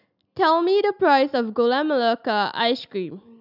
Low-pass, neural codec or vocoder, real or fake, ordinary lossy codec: 5.4 kHz; none; real; none